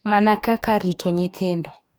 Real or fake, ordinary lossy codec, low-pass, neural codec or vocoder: fake; none; none; codec, 44.1 kHz, 2.6 kbps, DAC